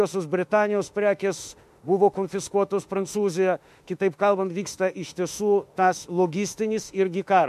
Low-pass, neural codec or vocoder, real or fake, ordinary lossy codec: 14.4 kHz; autoencoder, 48 kHz, 32 numbers a frame, DAC-VAE, trained on Japanese speech; fake; MP3, 64 kbps